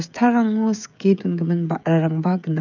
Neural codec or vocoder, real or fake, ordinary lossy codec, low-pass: codec, 16 kHz, 16 kbps, FreqCodec, smaller model; fake; none; 7.2 kHz